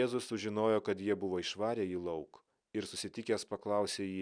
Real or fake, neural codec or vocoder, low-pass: real; none; 9.9 kHz